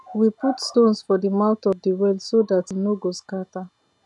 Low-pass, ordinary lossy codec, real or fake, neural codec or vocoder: 10.8 kHz; none; real; none